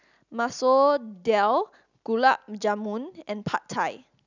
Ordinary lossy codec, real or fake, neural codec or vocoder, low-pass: none; real; none; 7.2 kHz